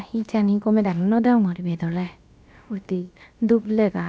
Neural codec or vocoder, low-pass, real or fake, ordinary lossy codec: codec, 16 kHz, about 1 kbps, DyCAST, with the encoder's durations; none; fake; none